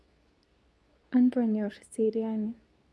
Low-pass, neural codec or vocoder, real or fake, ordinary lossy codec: none; codec, 24 kHz, 0.9 kbps, WavTokenizer, medium speech release version 2; fake; none